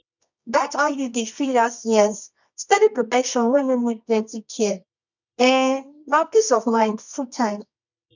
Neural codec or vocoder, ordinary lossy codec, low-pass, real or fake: codec, 24 kHz, 0.9 kbps, WavTokenizer, medium music audio release; none; 7.2 kHz; fake